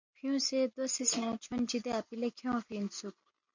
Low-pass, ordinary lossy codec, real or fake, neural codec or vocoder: 7.2 kHz; MP3, 48 kbps; real; none